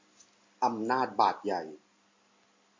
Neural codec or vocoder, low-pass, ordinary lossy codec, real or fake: none; 7.2 kHz; MP3, 48 kbps; real